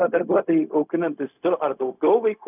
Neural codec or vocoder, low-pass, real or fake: codec, 16 kHz, 0.4 kbps, LongCat-Audio-Codec; 3.6 kHz; fake